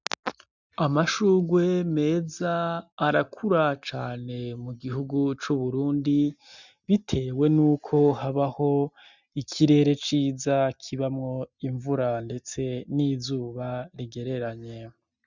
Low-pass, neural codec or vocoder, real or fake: 7.2 kHz; none; real